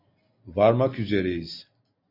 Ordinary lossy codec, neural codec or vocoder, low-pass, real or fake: MP3, 32 kbps; none; 5.4 kHz; real